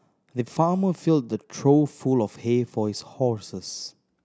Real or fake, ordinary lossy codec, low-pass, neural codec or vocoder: real; none; none; none